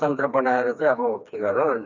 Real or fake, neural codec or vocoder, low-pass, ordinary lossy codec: fake; codec, 16 kHz, 2 kbps, FreqCodec, smaller model; 7.2 kHz; none